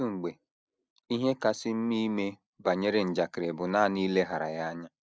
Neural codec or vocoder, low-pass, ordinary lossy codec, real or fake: none; none; none; real